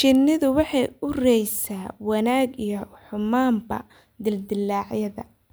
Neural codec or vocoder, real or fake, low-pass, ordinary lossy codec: none; real; none; none